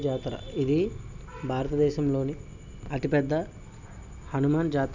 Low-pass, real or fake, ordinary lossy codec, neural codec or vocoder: 7.2 kHz; real; none; none